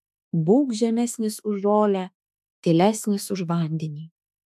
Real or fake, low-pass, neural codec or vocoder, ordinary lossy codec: fake; 14.4 kHz; autoencoder, 48 kHz, 32 numbers a frame, DAC-VAE, trained on Japanese speech; AAC, 96 kbps